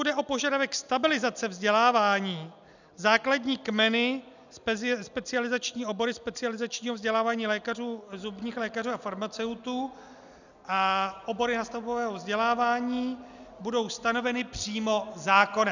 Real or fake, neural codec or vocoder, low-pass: real; none; 7.2 kHz